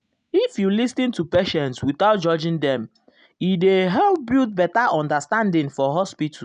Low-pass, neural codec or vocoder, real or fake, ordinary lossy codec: 9.9 kHz; none; real; none